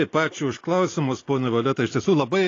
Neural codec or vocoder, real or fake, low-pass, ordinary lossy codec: none; real; 7.2 kHz; AAC, 32 kbps